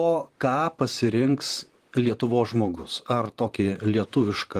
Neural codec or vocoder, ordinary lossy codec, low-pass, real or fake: vocoder, 44.1 kHz, 128 mel bands, Pupu-Vocoder; Opus, 24 kbps; 14.4 kHz; fake